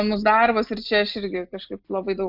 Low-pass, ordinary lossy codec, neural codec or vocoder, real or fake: 5.4 kHz; AAC, 48 kbps; none; real